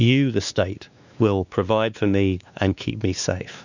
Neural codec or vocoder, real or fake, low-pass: codec, 16 kHz, 2 kbps, X-Codec, WavLM features, trained on Multilingual LibriSpeech; fake; 7.2 kHz